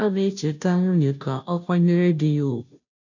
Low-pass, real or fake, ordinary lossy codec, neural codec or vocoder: 7.2 kHz; fake; none; codec, 16 kHz, 0.5 kbps, FunCodec, trained on Chinese and English, 25 frames a second